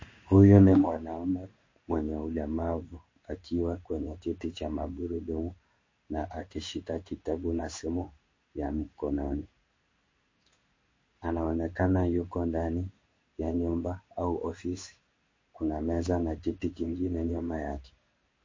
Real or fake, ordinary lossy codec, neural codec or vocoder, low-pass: fake; MP3, 32 kbps; codec, 16 kHz in and 24 kHz out, 1 kbps, XY-Tokenizer; 7.2 kHz